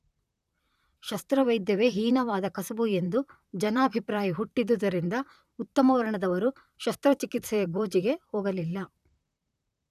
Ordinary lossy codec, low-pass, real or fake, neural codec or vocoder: none; 14.4 kHz; fake; vocoder, 44.1 kHz, 128 mel bands, Pupu-Vocoder